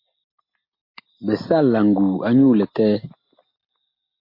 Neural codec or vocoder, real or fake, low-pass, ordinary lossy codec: none; real; 5.4 kHz; MP3, 32 kbps